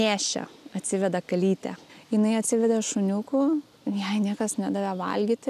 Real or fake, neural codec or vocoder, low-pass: real; none; 14.4 kHz